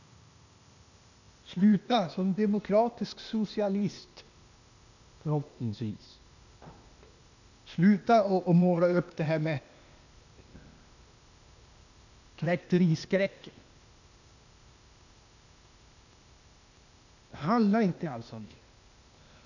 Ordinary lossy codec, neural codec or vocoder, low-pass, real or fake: none; codec, 16 kHz, 0.8 kbps, ZipCodec; 7.2 kHz; fake